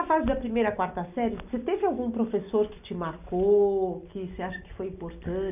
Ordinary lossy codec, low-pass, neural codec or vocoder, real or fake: none; 3.6 kHz; none; real